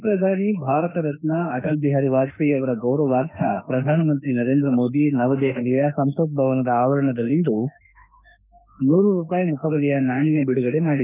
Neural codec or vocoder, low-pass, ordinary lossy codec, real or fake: autoencoder, 48 kHz, 32 numbers a frame, DAC-VAE, trained on Japanese speech; 3.6 kHz; none; fake